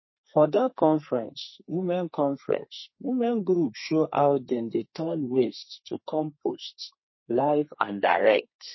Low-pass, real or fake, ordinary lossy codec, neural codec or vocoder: 7.2 kHz; fake; MP3, 24 kbps; codec, 32 kHz, 1.9 kbps, SNAC